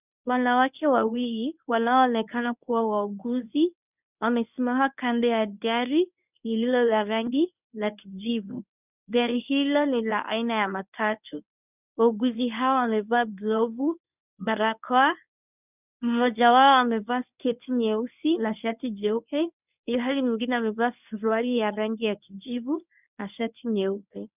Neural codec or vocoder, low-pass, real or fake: codec, 24 kHz, 0.9 kbps, WavTokenizer, medium speech release version 1; 3.6 kHz; fake